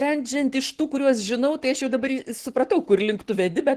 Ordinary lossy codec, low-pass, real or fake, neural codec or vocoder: Opus, 16 kbps; 14.4 kHz; fake; codec, 44.1 kHz, 7.8 kbps, Pupu-Codec